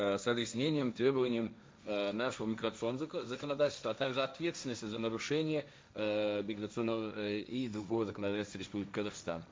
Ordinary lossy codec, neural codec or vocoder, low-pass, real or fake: none; codec, 16 kHz, 1.1 kbps, Voila-Tokenizer; none; fake